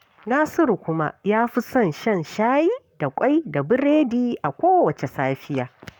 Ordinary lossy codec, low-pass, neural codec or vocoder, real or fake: none; 19.8 kHz; codec, 44.1 kHz, 7.8 kbps, Pupu-Codec; fake